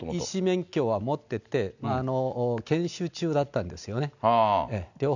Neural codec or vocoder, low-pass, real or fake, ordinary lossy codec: none; 7.2 kHz; real; none